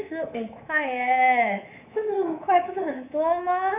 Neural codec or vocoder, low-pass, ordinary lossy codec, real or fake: codec, 24 kHz, 3.1 kbps, DualCodec; 3.6 kHz; none; fake